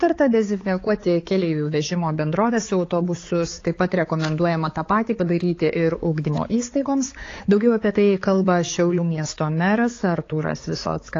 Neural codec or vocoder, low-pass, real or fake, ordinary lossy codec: codec, 16 kHz, 4 kbps, X-Codec, HuBERT features, trained on balanced general audio; 7.2 kHz; fake; AAC, 32 kbps